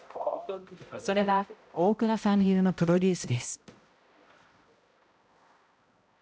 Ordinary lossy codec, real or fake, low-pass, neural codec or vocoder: none; fake; none; codec, 16 kHz, 0.5 kbps, X-Codec, HuBERT features, trained on balanced general audio